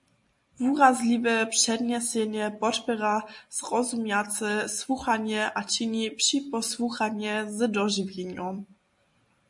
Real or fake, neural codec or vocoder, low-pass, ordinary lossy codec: real; none; 10.8 kHz; MP3, 48 kbps